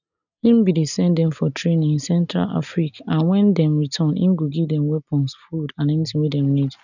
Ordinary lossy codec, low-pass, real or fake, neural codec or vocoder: none; 7.2 kHz; real; none